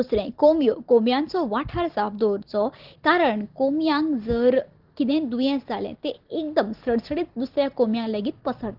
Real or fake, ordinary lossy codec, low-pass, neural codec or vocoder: real; Opus, 16 kbps; 5.4 kHz; none